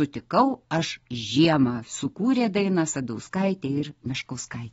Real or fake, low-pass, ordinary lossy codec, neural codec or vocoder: real; 19.8 kHz; AAC, 24 kbps; none